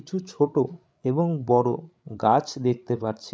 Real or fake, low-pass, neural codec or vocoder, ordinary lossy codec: fake; none; codec, 16 kHz, 16 kbps, FreqCodec, larger model; none